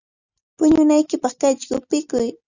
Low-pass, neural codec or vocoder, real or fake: 7.2 kHz; none; real